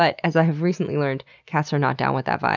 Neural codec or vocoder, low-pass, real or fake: none; 7.2 kHz; real